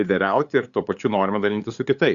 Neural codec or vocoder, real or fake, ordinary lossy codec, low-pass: codec, 16 kHz, 8 kbps, FunCodec, trained on LibriTTS, 25 frames a second; fake; Opus, 64 kbps; 7.2 kHz